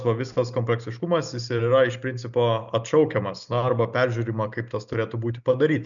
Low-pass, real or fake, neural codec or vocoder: 7.2 kHz; real; none